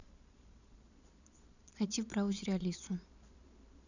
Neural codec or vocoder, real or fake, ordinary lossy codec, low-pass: vocoder, 22.05 kHz, 80 mel bands, Vocos; fake; none; 7.2 kHz